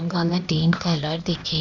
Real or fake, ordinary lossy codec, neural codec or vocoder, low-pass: fake; none; codec, 16 kHz, 0.8 kbps, ZipCodec; 7.2 kHz